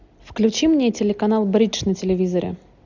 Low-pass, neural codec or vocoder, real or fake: 7.2 kHz; none; real